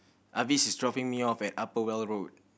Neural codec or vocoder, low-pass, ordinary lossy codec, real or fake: none; none; none; real